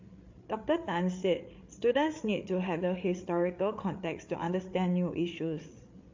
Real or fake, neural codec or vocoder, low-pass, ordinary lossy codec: fake; codec, 16 kHz, 8 kbps, FreqCodec, larger model; 7.2 kHz; MP3, 48 kbps